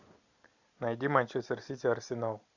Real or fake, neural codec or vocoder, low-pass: real; none; 7.2 kHz